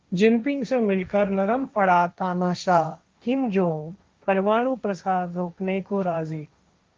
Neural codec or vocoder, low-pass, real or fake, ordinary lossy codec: codec, 16 kHz, 1.1 kbps, Voila-Tokenizer; 7.2 kHz; fake; Opus, 24 kbps